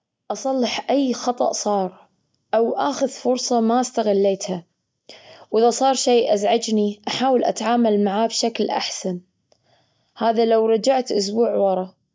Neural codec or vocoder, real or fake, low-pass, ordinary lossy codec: none; real; none; none